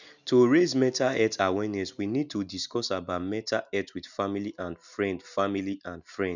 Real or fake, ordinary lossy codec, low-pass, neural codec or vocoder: real; none; 7.2 kHz; none